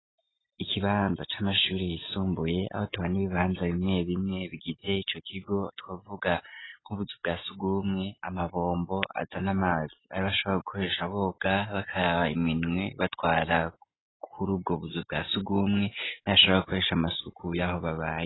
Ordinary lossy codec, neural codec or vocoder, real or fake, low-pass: AAC, 16 kbps; none; real; 7.2 kHz